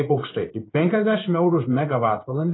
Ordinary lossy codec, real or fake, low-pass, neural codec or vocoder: AAC, 16 kbps; fake; 7.2 kHz; codec, 16 kHz in and 24 kHz out, 1 kbps, XY-Tokenizer